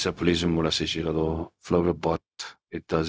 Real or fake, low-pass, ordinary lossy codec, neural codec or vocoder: fake; none; none; codec, 16 kHz, 0.4 kbps, LongCat-Audio-Codec